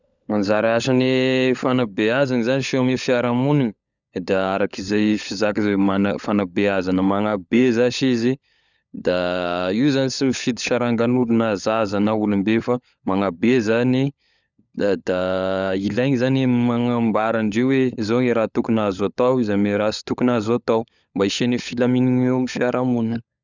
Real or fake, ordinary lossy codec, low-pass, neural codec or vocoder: fake; none; 7.2 kHz; codec, 16 kHz, 16 kbps, FunCodec, trained on LibriTTS, 50 frames a second